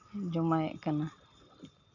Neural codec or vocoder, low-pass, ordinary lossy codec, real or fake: none; 7.2 kHz; none; real